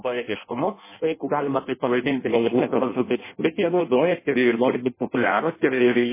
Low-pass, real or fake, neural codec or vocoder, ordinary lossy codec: 3.6 kHz; fake; codec, 16 kHz in and 24 kHz out, 0.6 kbps, FireRedTTS-2 codec; MP3, 16 kbps